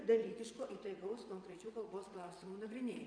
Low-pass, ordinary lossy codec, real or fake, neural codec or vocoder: 9.9 kHz; MP3, 96 kbps; fake; vocoder, 22.05 kHz, 80 mel bands, WaveNeXt